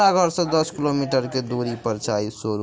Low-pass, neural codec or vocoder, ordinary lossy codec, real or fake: none; none; none; real